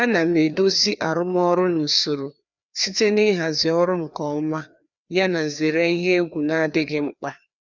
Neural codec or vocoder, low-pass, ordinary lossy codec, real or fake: codec, 16 kHz, 2 kbps, FreqCodec, larger model; 7.2 kHz; none; fake